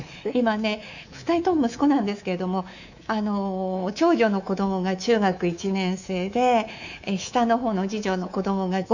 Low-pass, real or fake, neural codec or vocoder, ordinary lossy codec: 7.2 kHz; fake; codec, 24 kHz, 3.1 kbps, DualCodec; none